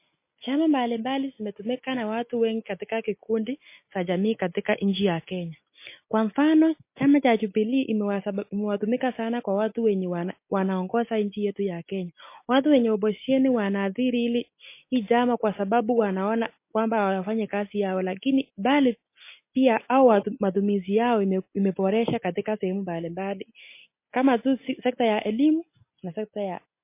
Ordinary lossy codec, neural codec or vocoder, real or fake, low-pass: MP3, 24 kbps; none; real; 3.6 kHz